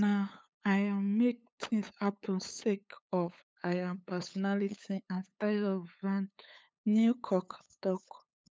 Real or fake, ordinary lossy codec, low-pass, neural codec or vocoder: fake; none; none; codec, 16 kHz, 8 kbps, FunCodec, trained on LibriTTS, 25 frames a second